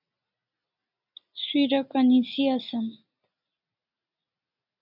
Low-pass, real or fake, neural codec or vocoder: 5.4 kHz; real; none